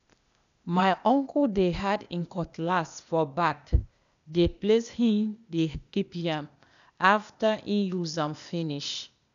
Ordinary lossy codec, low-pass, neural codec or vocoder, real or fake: none; 7.2 kHz; codec, 16 kHz, 0.8 kbps, ZipCodec; fake